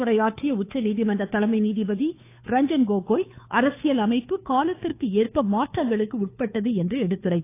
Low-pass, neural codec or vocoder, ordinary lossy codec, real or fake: 3.6 kHz; codec, 16 kHz, 2 kbps, FunCodec, trained on Chinese and English, 25 frames a second; AAC, 24 kbps; fake